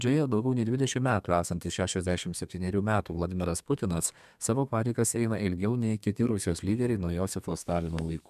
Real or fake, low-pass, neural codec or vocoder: fake; 14.4 kHz; codec, 32 kHz, 1.9 kbps, SNAC